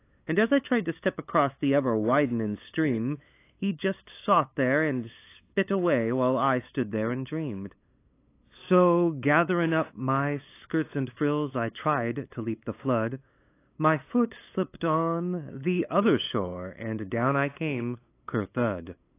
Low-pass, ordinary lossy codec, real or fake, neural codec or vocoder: 3.6 kHz; AAC, 24 kbps; fake; codec, 16 kHz, 8 kbps, FunCodec, trained on LibriTTS, 25 frames a second